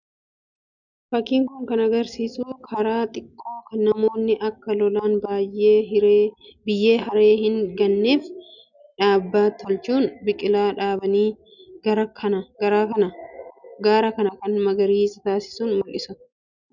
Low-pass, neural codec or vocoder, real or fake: 7.2 kHz; none; real